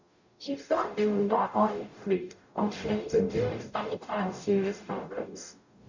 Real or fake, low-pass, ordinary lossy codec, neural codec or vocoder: fake; 7.2 kHz; none; codec, 44.1 kHz, 0.9 kbps, DAC